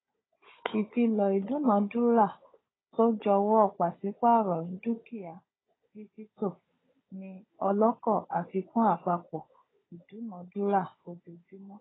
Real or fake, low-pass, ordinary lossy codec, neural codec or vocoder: fake; 7.2 kHz; AAC, 16 kbps; codec, 16 kHz, 16 kbps, FunCodec, trained on Chinese and English, 50 frames a second